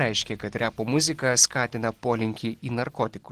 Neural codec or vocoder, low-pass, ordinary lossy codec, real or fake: none; 14.4 kHz; Opus, 16 kbps; real